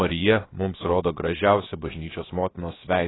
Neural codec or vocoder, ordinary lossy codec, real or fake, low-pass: codec, 16 kHz, about 1 kbps, DyCAST, with the encoder's durations; AAC, 16 kbps; fake; 7.2 kHz